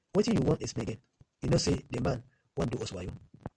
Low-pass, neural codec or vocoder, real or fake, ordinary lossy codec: 9.9 kHz; none; real; MP3, 64 kbps